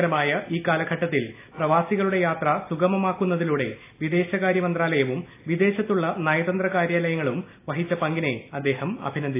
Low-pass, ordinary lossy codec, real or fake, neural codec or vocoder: 3.6 kHz; AAC, 24 kbps; real; none